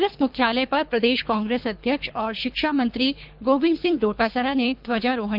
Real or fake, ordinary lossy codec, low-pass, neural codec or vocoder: fake; none; 5.4 kHz; codec, 24 kHz, 3 kbps, HILCodec